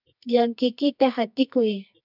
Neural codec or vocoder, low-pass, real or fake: codec, 24 kHz, 0.9 kbps, WavTokenizer, medium music audio release; 5.4 kHz; fake